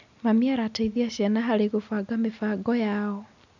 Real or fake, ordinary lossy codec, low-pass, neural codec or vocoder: real; none; 7.2 kHz; none